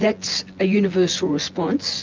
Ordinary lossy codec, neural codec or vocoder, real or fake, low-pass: Opus, 24 kbps; vocoder, 24 kHz, 100 mel bands, Vocos; fake; 7.2 kHz